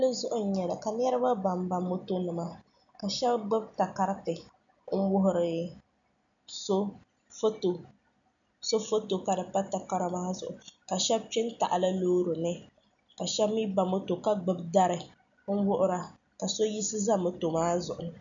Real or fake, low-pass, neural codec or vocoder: real; 7.2 kHz; none